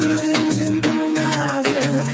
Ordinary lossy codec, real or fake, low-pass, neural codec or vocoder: none; fake; none; codec, 16 kHz, 4 kbps, FreqCodec, smaller model